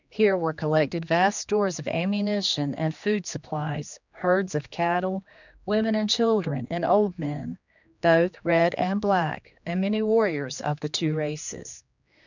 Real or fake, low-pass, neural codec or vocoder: fake; 7.2 kHz; codec, 16 kHz, 2 kbps, X-Codec, HuBERT features, trained on general audio